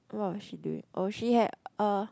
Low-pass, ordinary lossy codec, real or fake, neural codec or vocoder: none; none; real; none